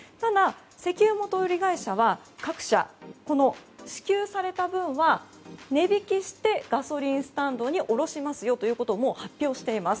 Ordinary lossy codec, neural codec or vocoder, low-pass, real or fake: none; none; none; real